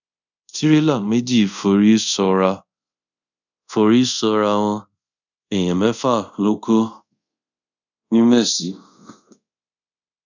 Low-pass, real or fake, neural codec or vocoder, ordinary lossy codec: 7.2 kHz; fake; codec, 24 kHz, 0.5 kbps, DualCodec; none